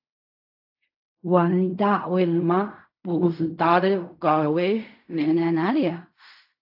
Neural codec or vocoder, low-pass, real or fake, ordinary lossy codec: codec, 16 kHz in and 24 kHz out, 0.4 kbps, LongCat-Audio-Codec, fine tuned four codebook decoder; 5.4 kHz; fake; AAC, 48 kbps